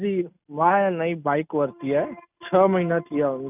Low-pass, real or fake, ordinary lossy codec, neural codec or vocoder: 3.6 kHz; real; none; none